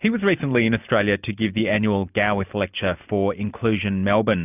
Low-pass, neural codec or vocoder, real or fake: 3.6 kHz; none; real